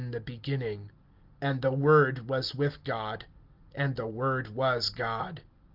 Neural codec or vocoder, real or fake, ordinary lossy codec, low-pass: none; real; Opus, 32 kbps; 5.4 kHz